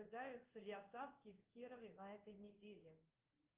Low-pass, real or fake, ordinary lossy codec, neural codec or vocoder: 3.6 kHz; fake; Opus, 32 kbps; codec, 16 kHz in and 24 kHz out, 1 kbps, XY-Tokenizer